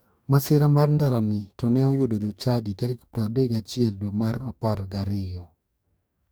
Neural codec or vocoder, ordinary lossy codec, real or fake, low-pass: codec, 44.1 kHz, 2.6 kbps, DAC; none; fake; none